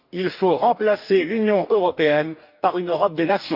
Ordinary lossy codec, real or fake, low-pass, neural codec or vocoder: none; fake; 5.4 kHz; codec, 44.1 kHz, 2.6 kbps, DAC